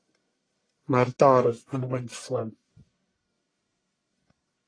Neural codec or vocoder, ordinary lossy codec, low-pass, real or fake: codec, 44.1 kHz, 1.7 kbps, Pupu-Codec; AAC, 32 kbps; 9.9 kHz; fake